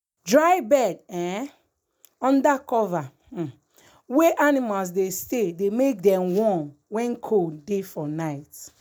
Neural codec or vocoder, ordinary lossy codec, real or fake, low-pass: none; none; real; none